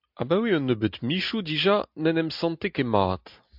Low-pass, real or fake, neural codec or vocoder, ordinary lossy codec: 5.4 kHz; real; none; MP3, 48 kbps